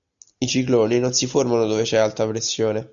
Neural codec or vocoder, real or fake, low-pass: none; real; 7.2 kHz